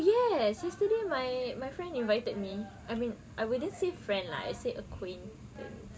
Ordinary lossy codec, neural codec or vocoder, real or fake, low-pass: none; none; real; none